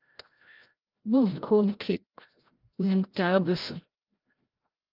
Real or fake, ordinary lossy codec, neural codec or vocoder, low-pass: fake; Opus, 24 kbps; codec, 16 kHz, 0.5 kbps, FreqCodec, larger model; 5.4 kHz